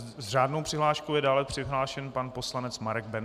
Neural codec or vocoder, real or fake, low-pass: none; real; 14.4 kHz